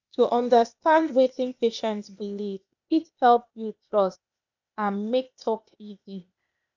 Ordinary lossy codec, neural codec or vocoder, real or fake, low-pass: none; codec, 16 kHz, 0.8 kbps, ZipCodec; fake; 7.2 kHz